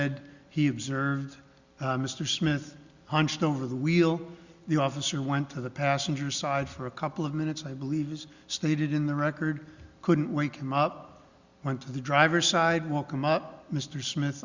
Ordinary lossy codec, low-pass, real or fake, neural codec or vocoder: Opus, 64 kbps; 7.2 kHz; real; none